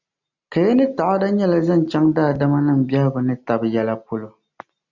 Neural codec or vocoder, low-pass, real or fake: none; 7.2 kHz; real